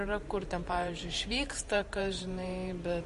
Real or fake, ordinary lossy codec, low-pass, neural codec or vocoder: fake; MP3, 48 kbps; 14.4 kHz; vocoder, 44.1 kHz, 128 mel bands every 512 samples, BigVGAN v2